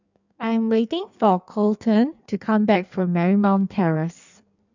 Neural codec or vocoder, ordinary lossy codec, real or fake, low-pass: codec, 16 kHz in and 24 kHz out, 1.1 kbps, FireRedTTS-2 codec; none; fake; 7.2 kHz